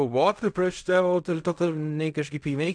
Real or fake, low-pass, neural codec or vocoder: fake; 9.9 kHz; codec, 16 kHz in and 24 kHz out, 0.4 kbps, LongCat-Audio-Codec, fine tuned four codebook decoder